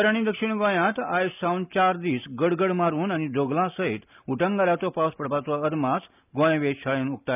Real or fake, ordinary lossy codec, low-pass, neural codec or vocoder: real; none; 3.6 kHz; none